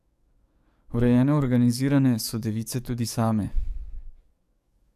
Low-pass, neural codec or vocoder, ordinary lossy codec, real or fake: 14.4 kHz; codec, 44.1 kHz, 7.8 kbps, DAC; AAC, 96 kbps; fake